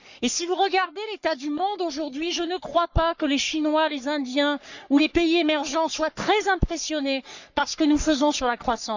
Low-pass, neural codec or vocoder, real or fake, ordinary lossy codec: 7.2 kHz; codec, 44.1 kHz, 3.4 kbps, Pupu-Codec; fake; none